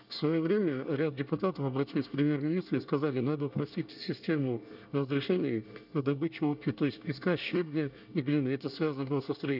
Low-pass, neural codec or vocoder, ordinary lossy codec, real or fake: 5.4 kHz; codec, 24 kHz, 1 kbps, SNAC; none; fake